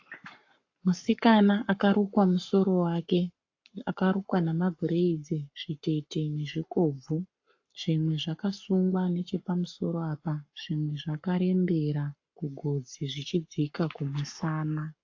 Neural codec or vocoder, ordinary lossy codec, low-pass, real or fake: codec, 44.1 kHz, 7.8 kbps, Pupu-Codec; AAC, 48 kbps; 7.2 kHz; fake